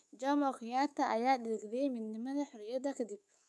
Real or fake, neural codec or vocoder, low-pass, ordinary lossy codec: fake; codec, 24 kHz, 3.1 kbps, DualCodec; none; none